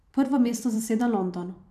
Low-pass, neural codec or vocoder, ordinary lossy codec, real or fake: 14.4 kHz; vocoder, 44.1 kHz, 128 mel bands every 256 samples, BigVGAN v2; none; fake